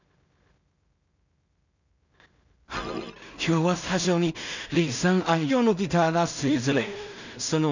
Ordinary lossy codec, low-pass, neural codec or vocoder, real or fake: none; 7.2 kHz; codec, 16 kHz in and 24 kHz out, 0.4 kbps, LongCat-Audio-Codec, two codebook decoder; fake